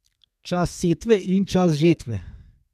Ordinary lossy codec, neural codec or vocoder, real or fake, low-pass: MP3, 96 kbps; codec, 32 kHz, 1.9 kbps, SNAC; fake; 14.4 kHz